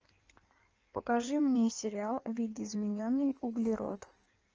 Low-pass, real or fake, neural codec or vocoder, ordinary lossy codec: 7.2 kHz; fake; codec, 16 kHz in and 24 kHz out, 1.1 kbps, FireRedTTS-2 codec; Opus, 24 kbps